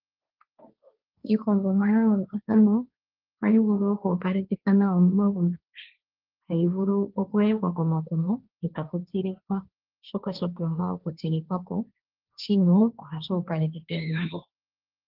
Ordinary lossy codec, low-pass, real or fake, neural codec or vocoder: Opus, 24 kbps; 5.4 kHz; fake; codec, 16 kHz, 1.1 kbps, Voila-Tokenizer